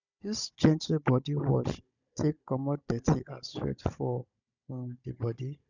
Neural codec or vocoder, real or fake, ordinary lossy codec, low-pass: codec, 16 kHz, 16 kbps, FunCodec, trained on Chinese and English, 50 frames a second; fake; none; 7.2 kHz